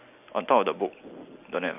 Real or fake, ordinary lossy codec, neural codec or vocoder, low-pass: real; AAC, 32 kbps; none; 3.6 kHz